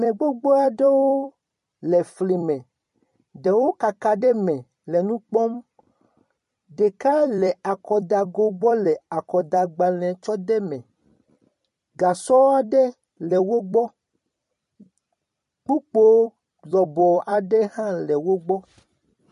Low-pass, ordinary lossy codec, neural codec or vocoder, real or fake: 14.4 kHz; MP3, 48 kbps; vocoder, 44.1 kHz, 128 mel bands every 256 samples, BigVGAN v2; fake